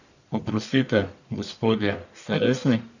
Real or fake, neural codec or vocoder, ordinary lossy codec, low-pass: fake; codec, 44.1 kHz, 1.7 kbps, Pupu-Codec; none; 7.2 kHz